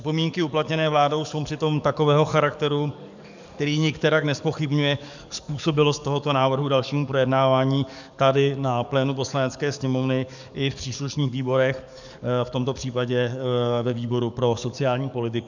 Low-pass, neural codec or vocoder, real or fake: 7.2 kHz; codec, 44.1 kHz, 7.8 kbps, DAC; fake